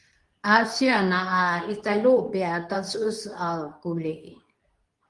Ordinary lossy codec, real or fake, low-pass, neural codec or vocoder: Opus, 24 kbps; fake; 10.8 kHz; codec, 24 kHz, 0.9 kbps, WavTokenizer, medium speech release version 2